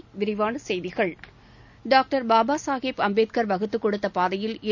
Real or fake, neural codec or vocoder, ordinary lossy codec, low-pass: real; none; none; 7.2 kHz